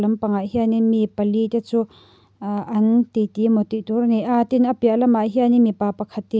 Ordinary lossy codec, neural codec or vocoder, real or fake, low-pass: none; none; real; none